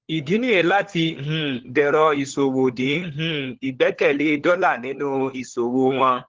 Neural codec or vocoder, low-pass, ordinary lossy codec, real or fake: codec, 16 kHz, 4 kbps, FunCodec, trained on LibriTTS, 50 frames a second; 7.2 kHz; Opus, 16 kbps; fake